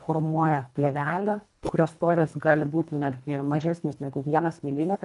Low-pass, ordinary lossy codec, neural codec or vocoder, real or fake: 10.8 kHz; AAC, 96 kbps; codec, 24 kHz, 1.5 kbps, HILCodec; fake